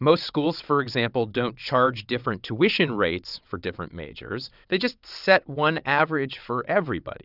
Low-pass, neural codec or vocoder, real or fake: 5.4 kHz; vocoder, 22.05 kHz, 80 mel bands, WaveNeXt; fake